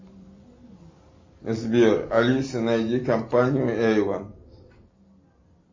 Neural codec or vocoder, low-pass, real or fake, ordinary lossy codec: none; 7.2 kHz; real; MP3, 32 kbps